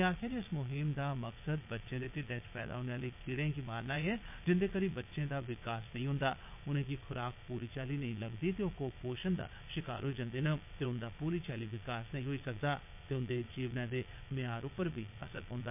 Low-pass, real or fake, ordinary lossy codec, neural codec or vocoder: 3.6 kHz; fake; MP3, 32 kbps; vocoder, 22.05 kHz, 80 mel bands, Vocos